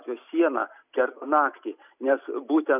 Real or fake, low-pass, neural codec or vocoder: real; 3.6 kHz; none